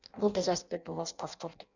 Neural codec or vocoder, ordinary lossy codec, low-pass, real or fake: codec, 16 kHz in and 24 kHz out, 0.6 kbps, FireRedTTS-2 codec; none; 7.2 kHz; fake